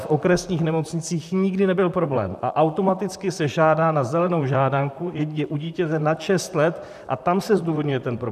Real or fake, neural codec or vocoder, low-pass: fake; vocoder, 44.1 kHz, 128 mel bands, Pupu-Vocoder; 14.4 kHz